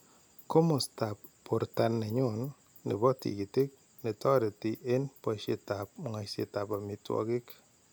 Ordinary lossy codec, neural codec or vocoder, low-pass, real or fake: none; none; none; real